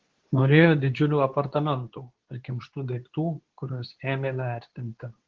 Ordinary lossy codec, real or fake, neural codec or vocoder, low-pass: Opus, 16 kbps; fake; codec, 24 kHz, 0.9 kbps, WavTokenizer, medium speech release version 2; 7.2 kHz